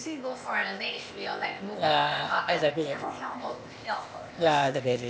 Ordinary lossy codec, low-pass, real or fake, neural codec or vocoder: none; none; fake; codec, 16 kHz, 0.8 kbps, ZipCodec